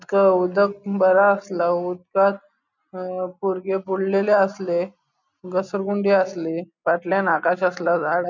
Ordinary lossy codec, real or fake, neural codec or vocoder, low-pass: none; real; none; 7.2 kHz